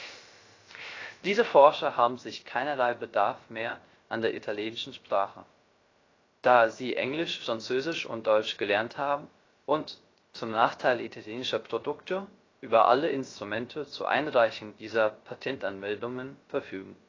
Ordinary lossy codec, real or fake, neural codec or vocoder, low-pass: AAC, 32 kbps; fake; codec, 16 kHz, 0.3 kbps, FocalCodec; 7.2 kHz